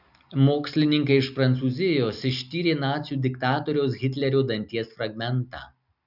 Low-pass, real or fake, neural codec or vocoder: 5.4 kHz; real; none